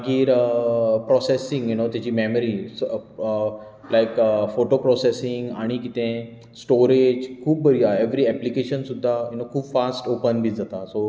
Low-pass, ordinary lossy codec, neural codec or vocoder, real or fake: none; none; none; real